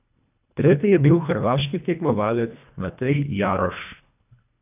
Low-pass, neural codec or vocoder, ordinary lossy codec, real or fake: 3.6 kHz; codec, 24 kHz, 1.5 kbps, HILCodec; none; fake